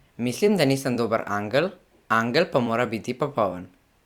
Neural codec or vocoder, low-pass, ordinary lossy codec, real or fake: vocoder, 44.1 kHz, 128 mel bands every 256 samples, BigVGAN v2; 19.8 kHz; Opus, 64 kbps; fake